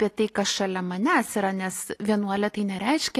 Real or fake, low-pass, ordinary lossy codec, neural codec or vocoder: real; 14.4 kHz; AAC, 48 kbps; none